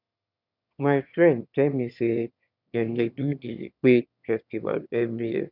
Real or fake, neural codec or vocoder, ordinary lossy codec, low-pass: fake; autoencoder, 22.05 kHz, a latent of 192 numbers a frame, VITS, trained on one speaker; none; 5.4 kHz